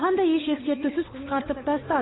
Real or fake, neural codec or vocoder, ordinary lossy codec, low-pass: fake; codec, 16 kHz, 8 kbps, FunCodec, trained on Chinese and English, 25 frames a second; AAC, 16 kbps; 7.2 kHz